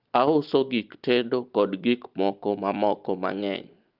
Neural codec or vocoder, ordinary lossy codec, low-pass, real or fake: none; Opus, 24 kbps; 5.4 kHz; real